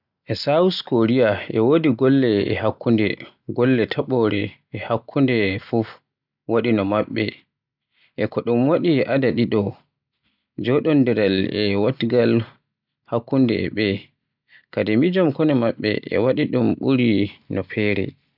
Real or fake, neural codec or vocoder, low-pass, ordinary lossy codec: real; none; 5.4 kHz; none